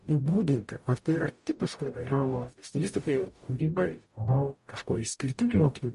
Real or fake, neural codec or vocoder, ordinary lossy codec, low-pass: fake; codec, 44.1 kHz, 0.9 kbps, DAC; MP3, 48 kbps; 14.4 kHz